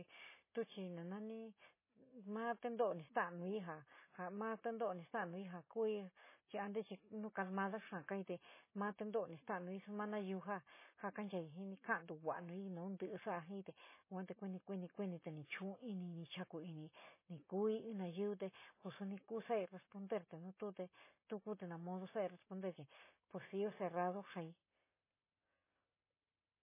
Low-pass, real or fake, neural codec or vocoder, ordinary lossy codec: 3.6 kHz; real; none; MP3, 16 kbps